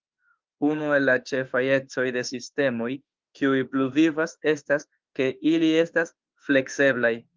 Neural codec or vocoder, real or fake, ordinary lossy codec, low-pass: autoencoder, 48 kHz, 32 numbers a frame, DAC-VAE, trained on Japanese speech; fake; Opus, 32 kbps; 7.2 kHz